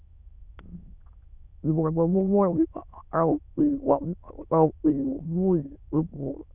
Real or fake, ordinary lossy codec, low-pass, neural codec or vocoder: fake; none; 3.6 kHz; autoencoder, 22.05 kHz, a latent of 192 numbers a frame, VITS, trained on many speakers